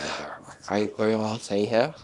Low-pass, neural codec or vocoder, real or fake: 10.8 kHz; codec, 24 kHz, 0.9 kbps, WavTokenizer, small release; fake